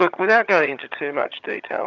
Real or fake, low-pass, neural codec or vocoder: fake; 7.2 kHz; vocoder, 22.05 kHz, 80 mel bands, HiFi-GAN